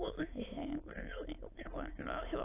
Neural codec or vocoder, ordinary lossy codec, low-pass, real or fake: autoencoder, 22.05 kHz, a latent of 192 numbers a frame, VITS, trained on many speakers; AAC, 24 kbps; 3.6 kHz; fake